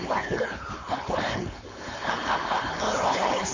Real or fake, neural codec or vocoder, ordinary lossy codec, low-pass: fake; codec, 16 kHz, 4.8 kbps, FACodec; AAC, 32 kbps; 7.2 kHz